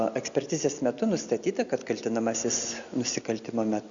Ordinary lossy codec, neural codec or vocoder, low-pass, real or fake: Opus, 64 kbps; none; 7.2 kHz; real